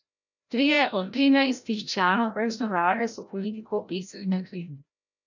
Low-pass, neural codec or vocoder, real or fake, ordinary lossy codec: 7.2 kHz; codec, 16 kHz, 0.5 kbps, FreqCodec, larger model; fake; none